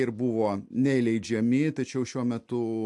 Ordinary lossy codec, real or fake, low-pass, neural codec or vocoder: MP3, 64 kbps; real; 10.8 kHz; none